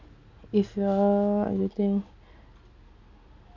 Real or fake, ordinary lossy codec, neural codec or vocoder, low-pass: real; none; none; 7.2 kHz